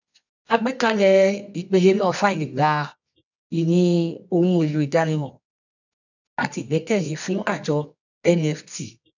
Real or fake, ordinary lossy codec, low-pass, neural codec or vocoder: fake; none; 7.2 kHz; codec, 24 kHz, 0.9 kbps, WavTokenizer, medium music audio release